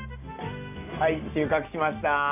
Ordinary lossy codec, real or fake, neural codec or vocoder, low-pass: none; real; none; 3.6 kHz